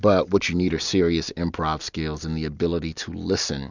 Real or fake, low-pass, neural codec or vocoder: real; 7.2 kHz; none